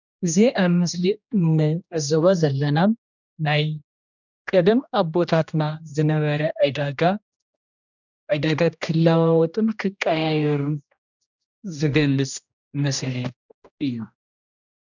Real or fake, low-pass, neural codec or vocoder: fake; 7.2 kHz; codec, 16 kHz, 1 kbps, X-Codec, HuBERT features, trained on general audio